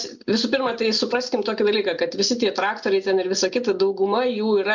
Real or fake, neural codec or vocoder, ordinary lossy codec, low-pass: real; none; MP3, 64 kbps; 7.2 kHz